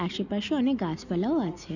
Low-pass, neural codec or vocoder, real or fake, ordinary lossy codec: 7.2 kHz; none; real; none